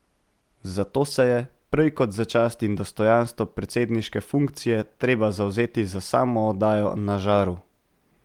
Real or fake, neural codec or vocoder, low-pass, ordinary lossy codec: real; none; 19.8 kHz; Opus, 24 kbps